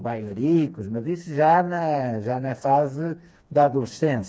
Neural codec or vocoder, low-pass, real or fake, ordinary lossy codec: codec, 16 kHz, 2 kbps, FreqCodec, smaller model; none; fake; none